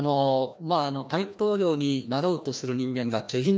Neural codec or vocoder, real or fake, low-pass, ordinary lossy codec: codec, 16 kHz, 1 kbps, FreqCodec, larger model; fake; none; none